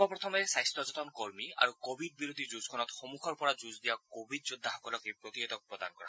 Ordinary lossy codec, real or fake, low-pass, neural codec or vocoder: none; real; none; none